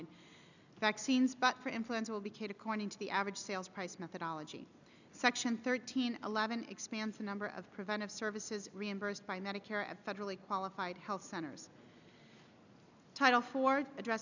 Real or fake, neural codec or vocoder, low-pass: real; none; 7.2 kHz